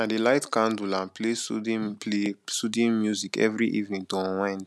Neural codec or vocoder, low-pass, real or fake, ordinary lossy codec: none; none; real; none